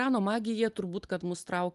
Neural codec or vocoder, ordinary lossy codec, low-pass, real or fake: none; Opus, 32 kbps; 10.8 kHz; real